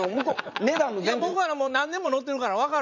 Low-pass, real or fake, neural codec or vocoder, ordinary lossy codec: 7.2 kHz; fake; vocoder, 44.1 kHz, 80 mel bands, Vocos; MP3, 64 kbps